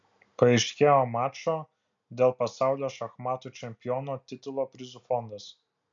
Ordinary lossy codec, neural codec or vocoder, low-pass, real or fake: MP3, 64 kbps; none; 7.2 kHz; real